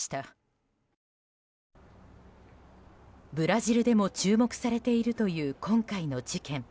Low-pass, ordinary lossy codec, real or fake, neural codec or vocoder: none; none; real; none